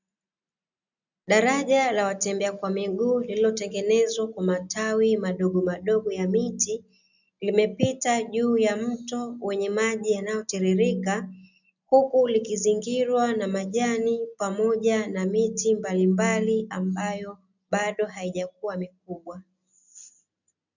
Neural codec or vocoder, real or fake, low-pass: none; real; 7.2 kHz